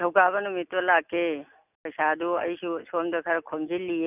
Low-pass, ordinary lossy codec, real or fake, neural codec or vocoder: 3.6 kHz; none; real; none